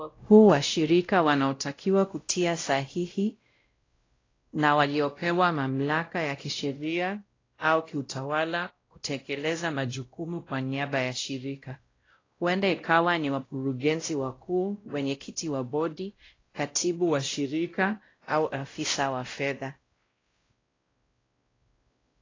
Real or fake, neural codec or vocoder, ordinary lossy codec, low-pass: fake; codec, 16 kHz, 0.5 kbps, X-Codec, WavLM features, trained on Multilingual LibriSpeech; AAC, 32 kbps; 7.2 kHz